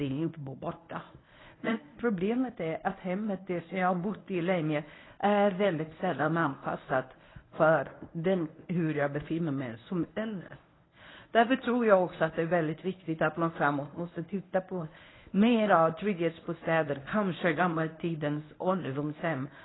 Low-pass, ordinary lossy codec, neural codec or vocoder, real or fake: 7.2 kHz; AAC, 16 kbps; codec, 24 kHz, 0.9 kbps, WavTokenizer, medium speech release version 1; fake